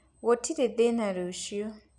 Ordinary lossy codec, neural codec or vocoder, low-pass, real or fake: none; none; 10.8 kHz; real